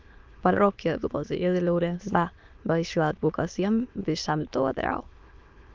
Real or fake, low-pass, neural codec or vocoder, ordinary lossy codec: fake; 7.2 kHz; autoencoder, 22.05 kHz, a latent of 192 numbers a frame, VITS, trained on many speakers; Opus, 32 kbps